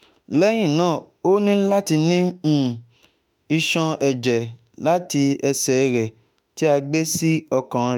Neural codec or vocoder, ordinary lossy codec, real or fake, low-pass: autoencoder, 48 kHz, 32 numbers a frame, DAC-VAE, trained on Japanese speech; none; fake; 19.8 kHz